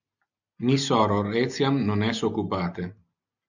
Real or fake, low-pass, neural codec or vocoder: real; 7.2 kHz; none